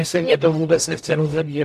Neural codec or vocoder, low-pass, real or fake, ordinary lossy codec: codec, 44.1 kHz, 0.9 kbps, DAC; 14.4 kHz; fake; MP3, 64 kbps